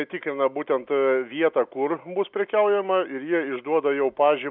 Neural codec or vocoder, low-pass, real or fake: none; 5.4 kHz; real